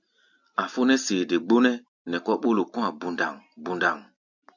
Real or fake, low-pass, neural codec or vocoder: real; 7.2 kHz; none